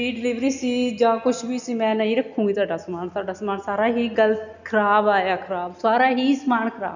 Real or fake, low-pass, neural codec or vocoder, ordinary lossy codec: real; 7.2 kHz; none; none